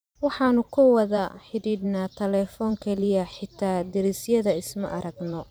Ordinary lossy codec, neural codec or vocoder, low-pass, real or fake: none; vocoder, 44.1 kHz, 128 mel bands every 512 samples, BigVGAN v2; none; fake